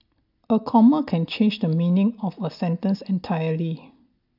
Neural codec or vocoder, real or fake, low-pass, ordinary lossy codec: none; real; 5.4 kHz; AAC, 48 kbps